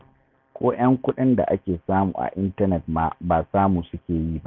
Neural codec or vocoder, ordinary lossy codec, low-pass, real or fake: none; none; 7.2 kHz; real